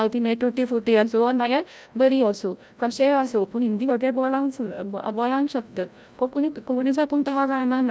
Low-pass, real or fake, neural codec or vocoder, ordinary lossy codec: none; fake; codec, 16 kHz, 0.5 kbps, FreqCodec, larger model; none